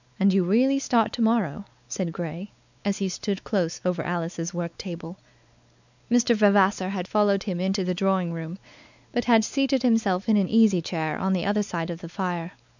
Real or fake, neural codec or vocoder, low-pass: fake; codec, 16 kHz, 4 kbps, X-Codec, WavLM features, trained on Multilingual LibriSpeech; 7.2 kHz